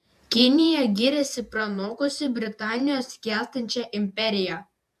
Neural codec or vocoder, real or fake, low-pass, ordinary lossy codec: vocoder, 48 kHz, 128 mel bands, Vocos; fake; 14.4 kHz; AAC, 96 kbps